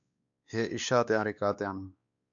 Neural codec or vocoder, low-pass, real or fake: codec, 16 kHz, 2 kbps, X-Codec, WavLM features, trained on Multilingual LibriSpeech; 7.2 kHz; fake